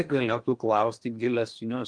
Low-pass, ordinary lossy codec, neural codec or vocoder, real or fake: 9.9 kHz; Opus, 32 kbps; codec, 16 kHz in and 24 kHz out, 0.8 kbps, FocalCodec, streaming, 65536 codes; fake